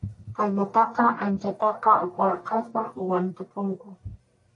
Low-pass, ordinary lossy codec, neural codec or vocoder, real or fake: 10.8 kHz; AAC, 48 kbps; codec, 44.1 kHz, 1.7 kbps, Pupu-Codec; fake